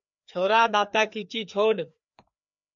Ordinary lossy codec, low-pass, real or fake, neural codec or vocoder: MP3, 64 kbps; 7.2 kHz; fake; codec, 16 kHz, 2 kbps, FreqCodec, larger model